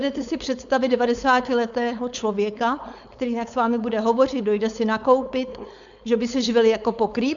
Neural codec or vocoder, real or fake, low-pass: codec, 16 kHz, 4.8 kbps, FACodec; fake; 7.2 kHz